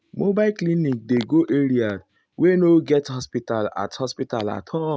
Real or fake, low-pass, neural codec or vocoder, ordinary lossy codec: real; none; none; none